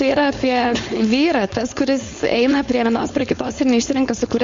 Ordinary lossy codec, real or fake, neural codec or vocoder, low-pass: MP3, 48 kbps; fake; codec, 16 kHz, 4.8 kbps, FACodec; 7.2 kHz